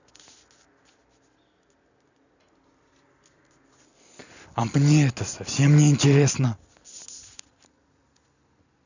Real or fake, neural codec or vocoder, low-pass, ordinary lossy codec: real; none; 7.2 kHz; none